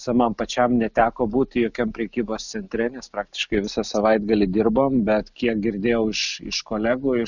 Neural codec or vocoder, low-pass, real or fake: none; 7.2 kHz; real